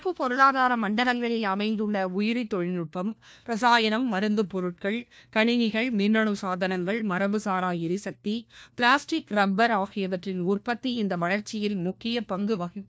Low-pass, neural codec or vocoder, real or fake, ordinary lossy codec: none; codec, 16 kHz, 1 kbps, FunCodec, trained on LibriTTS, 50 frames a second; fake; none